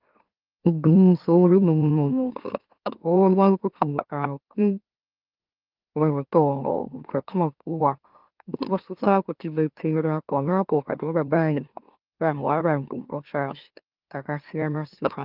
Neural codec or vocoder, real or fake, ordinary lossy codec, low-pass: autoencoder, 44.1 kHz, a latent of 192 numbers a frame, MeloTTS; fake; Opus, 32 kbps; 5.4 kHz